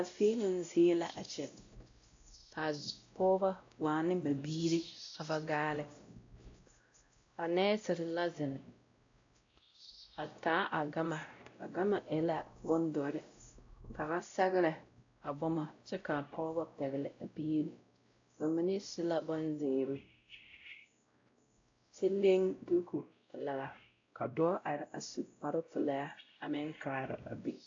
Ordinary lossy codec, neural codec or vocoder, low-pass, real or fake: AAC, 48 kbps; codec, 16 kHz, 0.5 kbps, X-Codec, WavLM features, trained on Multilingual LibriSpeech; 7.2 kHz; fake